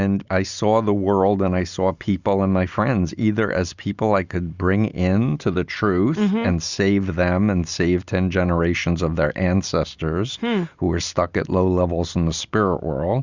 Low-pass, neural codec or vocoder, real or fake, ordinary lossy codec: 7.2 kHz; none; real; Opus, 64 kbps